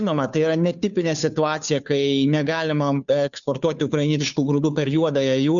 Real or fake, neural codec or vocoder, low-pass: fake; codec, 16 kHz, 2 kbps, FunCodec, trained on Chinese and English, 25 frames a second; 7.2 kHz